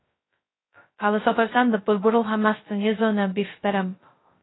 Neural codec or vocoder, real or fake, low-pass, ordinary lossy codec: codec, 16 kHz, 0.2 kbps, FocalCodec; fake; 7.2 kHz; AAC, 16 kbps